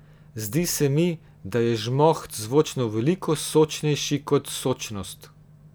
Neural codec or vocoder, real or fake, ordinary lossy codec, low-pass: none; real; none; none